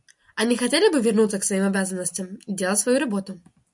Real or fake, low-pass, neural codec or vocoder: real; 10.8 kHz; none